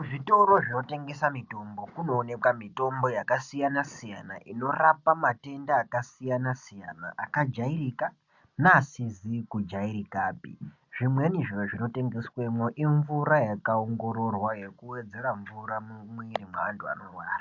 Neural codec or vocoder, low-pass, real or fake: none; 7.2 kHz; real